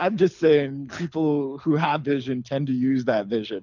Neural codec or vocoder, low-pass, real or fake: codec, 24 kHz, 6 kbps, HILCodec; 7.2 kHz; fake